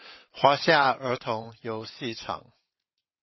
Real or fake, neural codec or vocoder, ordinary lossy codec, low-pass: fake; vocoder, 22.05 kHz, 80 mel bands, WaveNeXt; MP3, 24 kbps; 7.2 kHz